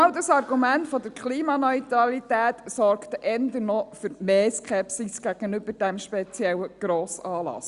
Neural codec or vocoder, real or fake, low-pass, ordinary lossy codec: none; real; 10.8 kHz; Opus, 64 kbps